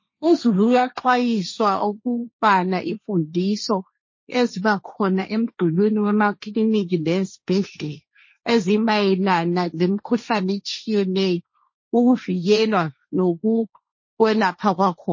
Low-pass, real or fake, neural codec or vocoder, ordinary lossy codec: 7.2 kHz; fake; codec, 16 kHz, 1.1 kbps, Voila-Tokenizer; MP3, 32 kbps